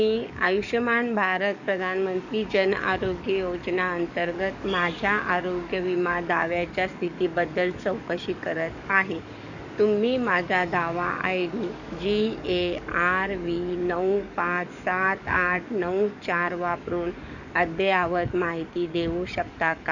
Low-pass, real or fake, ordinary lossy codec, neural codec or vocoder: 7.2 kHz; fake; none; codec, 44.1 kHz, 7.8 kbps, DAC